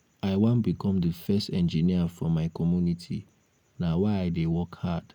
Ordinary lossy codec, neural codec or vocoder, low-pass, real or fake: none; none; 19.8 kHz; real